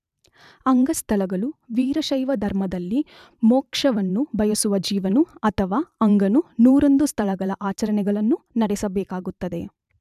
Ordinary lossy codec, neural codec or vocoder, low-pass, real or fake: none; vocoder, 44.1 kHz, 128 mel bands every 512 samples, BigVGAN v2; 14.4 kHz; fake